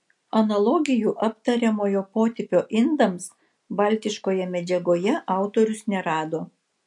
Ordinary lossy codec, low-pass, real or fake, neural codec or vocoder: MP3, 64 kbps; 10.8 kHz; real; none